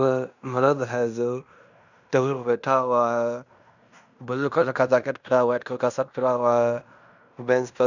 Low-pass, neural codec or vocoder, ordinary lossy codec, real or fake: 7.2 kHz; codec, 16 kHz in and 24 kHz out, 0.9 kbps, LongCat-Audio-Codec, fine tuned four codebook decoder; none; fake